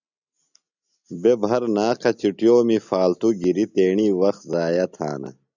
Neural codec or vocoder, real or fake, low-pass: none; real; 7.2 kHz